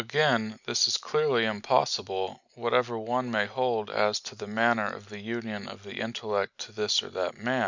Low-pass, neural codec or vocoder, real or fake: 7.2 kHz; none; real